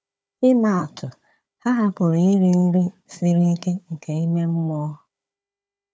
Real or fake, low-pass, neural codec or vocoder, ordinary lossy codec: fake; none; codec, 16 kHz, 4 kbps, FunCodec, trained on Chinese and English, 50 frames a second; none